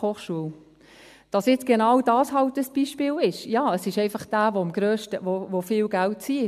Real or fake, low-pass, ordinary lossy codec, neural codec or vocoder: real; 14.4 kHz; MP3, 96 kbps; none